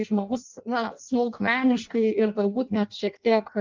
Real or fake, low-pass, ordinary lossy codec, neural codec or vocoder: fake; 7.2 kHz; Opus, 24 kbps; codec, 16 kHz in and 24 kHz out, 0.6 kbps, FireRedTTS-2 codec